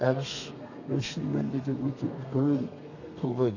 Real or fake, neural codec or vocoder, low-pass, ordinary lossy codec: fake; codec, 32 kHz, 1.9 kbps, SNAC; 7.2 kHz; none